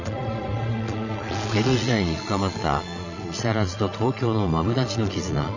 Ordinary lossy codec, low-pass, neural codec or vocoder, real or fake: none; 7.2 kHz; vocoder, 22.05 kHz, 80 mel bands, Vocos; fake